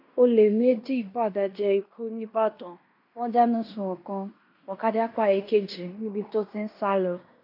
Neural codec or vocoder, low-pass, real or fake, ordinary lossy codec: codec, 16 kHz in and 24 kHz out, 0.9 kbps, LongCat-Audio-Codec, fine tuned four codebook decoder; 5.4 kHz; fake; none